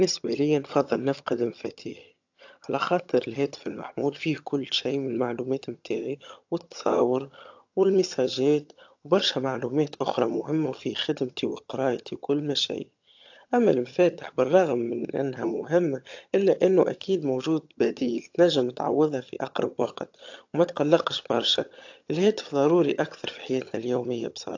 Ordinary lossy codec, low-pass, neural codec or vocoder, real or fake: AAC, 48 kbps; 7.2 kHz; vocoder, 22.05 kHz, 80 mel bands, HiFi-GAN; fake